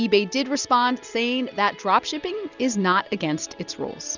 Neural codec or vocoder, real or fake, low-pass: none; real; 7.2 kHz